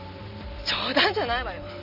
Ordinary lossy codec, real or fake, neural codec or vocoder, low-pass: none; real; none; 5.4 kHz